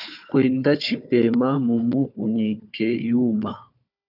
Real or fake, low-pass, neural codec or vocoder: fake; 5.4 kHz; codec, 16 kHz, 4 kbps, FunCodec, trained on Chinese and English, 50 frames a second